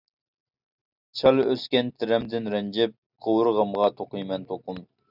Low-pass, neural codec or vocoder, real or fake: 5.4 kHz; none; real